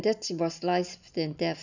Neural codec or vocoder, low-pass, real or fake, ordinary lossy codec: none; 7.2 kHz; real; none